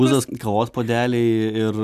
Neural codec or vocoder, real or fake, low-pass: none; real; 14.4 kHz